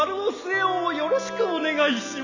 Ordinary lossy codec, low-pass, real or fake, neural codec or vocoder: none; 7.2 kHz; real; none